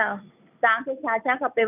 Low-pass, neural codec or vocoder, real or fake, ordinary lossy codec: 3.6 kHz; none; real; none